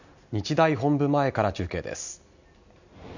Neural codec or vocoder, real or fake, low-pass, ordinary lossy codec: none; real; 7.2 kHz; none